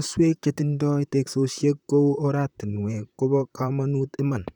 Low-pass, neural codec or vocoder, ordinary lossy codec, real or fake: 19.8 kHz; vocoder, 44.1 kHz, 128 mel bands, Pupu-Vocoder; none; fake